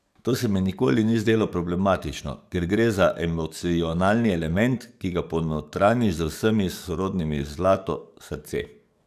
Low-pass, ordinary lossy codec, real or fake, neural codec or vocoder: 14.4 kHz; none; fake; codec, 44.1 kHz, 7.8 kbps, DAC